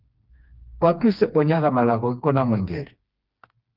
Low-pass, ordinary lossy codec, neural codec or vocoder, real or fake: 5.4 kHz; Opus, 32 kbps; codec, 16 kHz, 2 kbps, FreqCodec, smaller model; fake